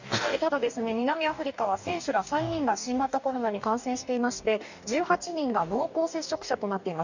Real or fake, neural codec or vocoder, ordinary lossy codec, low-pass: fake; codec, 44.1 kHz, 2.6 kbps, DAC; none; 7.2 kHz